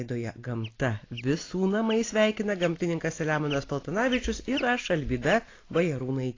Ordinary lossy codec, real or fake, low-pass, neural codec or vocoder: AAC, 32 kbps; real; 7.2 kHz; none